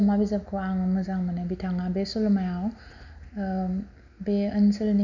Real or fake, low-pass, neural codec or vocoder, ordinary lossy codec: real; 7.2 kHz; none; none